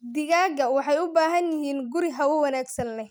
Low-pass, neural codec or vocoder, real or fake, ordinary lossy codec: none; none; real; none